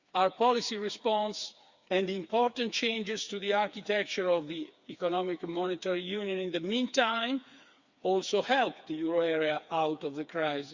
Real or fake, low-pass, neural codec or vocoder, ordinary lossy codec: fake; 7.2 kHz; codec, 16 kHz, 4 kbps, FreqCodec, smaller model; Opus, 64 kbps